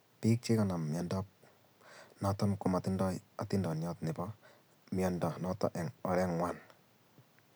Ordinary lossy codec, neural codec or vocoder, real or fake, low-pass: none; none; real; none